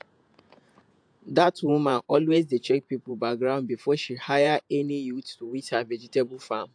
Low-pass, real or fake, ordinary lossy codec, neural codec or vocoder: 9.9 kHz; fake; AAC, 64 kbps; vocoder, 48 kHz, 128 mel bands, Vocos